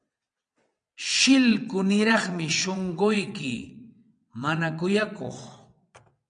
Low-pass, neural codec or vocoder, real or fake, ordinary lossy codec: 9.9 kHz; vocoder, 22.05 kHz, 80 mel bands, WaveNeXt; fake; MP3, 96 kbps